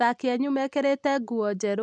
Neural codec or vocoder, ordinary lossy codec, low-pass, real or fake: none; none; 9.9 kHz; real